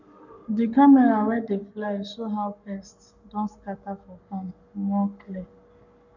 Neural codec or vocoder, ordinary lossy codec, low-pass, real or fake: codec, 44.1 kHz, 7.8 kbps, Pupu-Codec; none; 7.2 kHz; fake